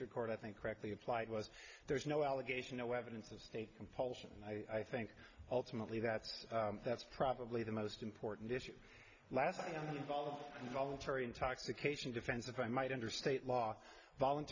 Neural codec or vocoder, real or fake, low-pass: none; real; 7.2 kHz